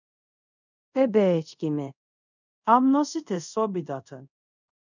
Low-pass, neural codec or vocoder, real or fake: 7.2 kHz; codec, 24 kHz, 0.5 kbps, DualCodec; fake